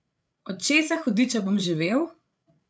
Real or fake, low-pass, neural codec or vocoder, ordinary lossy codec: fake; none; codec, 16 kHz, 8 kbps, FreqCodec, larger model; none